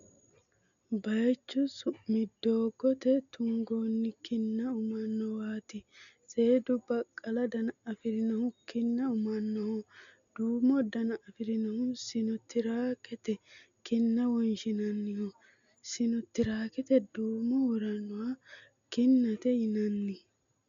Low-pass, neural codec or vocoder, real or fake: 7.2 kHz; none; real